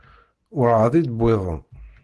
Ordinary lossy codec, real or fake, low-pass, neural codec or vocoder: Opus, 16 kbps; real; 10.8 kHz; none